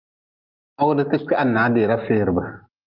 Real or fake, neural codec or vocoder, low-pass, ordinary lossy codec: real; none; 5.4 kHz; Opus, 24 kbps